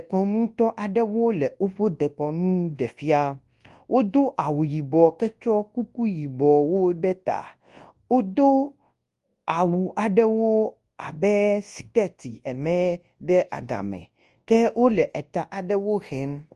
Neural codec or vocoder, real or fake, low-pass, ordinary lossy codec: codec, 24 kHz, 0.9 kbps, WavTokenizer, large speech release; fake; 10.8 kHz; Opus, 24 kbps